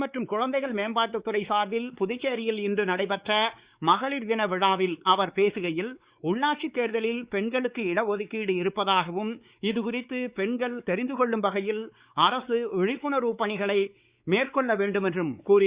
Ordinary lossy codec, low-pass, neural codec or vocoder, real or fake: Opus, 64 kbps; 3.6 kHz; codec, 16 kHz, 4 kbps, X-Codec, WavLM features, trained on Multilingual LibriSpeech; fake